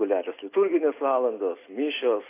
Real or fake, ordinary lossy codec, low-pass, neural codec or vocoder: real; AAC, 24 kbps; 3.6 kHz; none